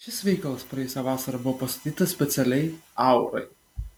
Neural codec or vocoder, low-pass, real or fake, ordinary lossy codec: none; 19.8 kHz; real; MP3, 96 kbps